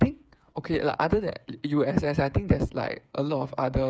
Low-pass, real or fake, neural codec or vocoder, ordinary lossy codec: none; fake; codec, 16 kHz, 8 kbps, FreqCodec, smaller model; none